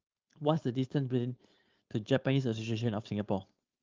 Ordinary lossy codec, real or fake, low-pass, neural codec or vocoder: Opus, 32 kbps; fake; 7.2 kHz; codec, 16 kHz, 4.8 kbps, FACodec